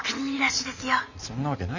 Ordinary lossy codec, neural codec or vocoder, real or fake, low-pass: none; none; real; 7.2 kHz